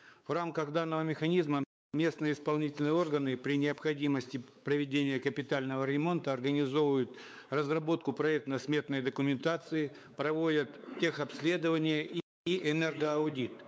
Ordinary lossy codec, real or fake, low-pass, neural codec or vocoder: none; fake; none; codec, 16 kHz, 4 kbps, X-Codec, WavLM features, trained on Multilingual LibriSpeech